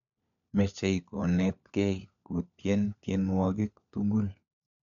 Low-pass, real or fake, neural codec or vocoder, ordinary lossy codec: 7.2 kHz; fake; codec, 16 kHz, 4 kbps, FunCodec, trained on LibriTTS, 50 frames a second; none